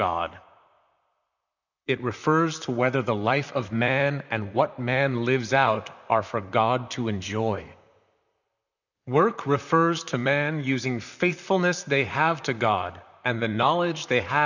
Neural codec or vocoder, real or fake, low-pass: vocoder, 44.1 kHz, 128 mel bands, Pupu-Vocoder; fake; 7.2 kHz